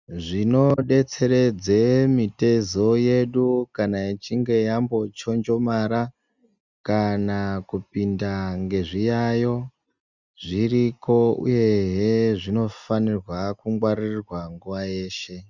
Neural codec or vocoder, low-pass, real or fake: none; 7.2 kHz; real